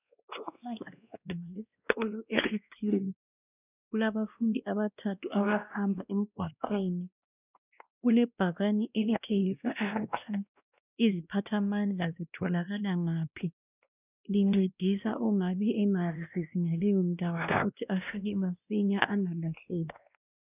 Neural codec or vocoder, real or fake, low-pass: codec, 16 kHz, 1 kbps, X-Codec, WavLM features, trained on Multilingual LibriSpeech; fake; 3.6 kHz